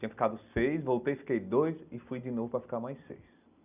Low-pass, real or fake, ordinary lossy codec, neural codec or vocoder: 3.6 kHz; real; none; none